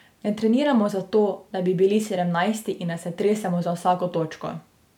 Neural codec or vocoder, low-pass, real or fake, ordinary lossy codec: none; 19.8 kHz; real; none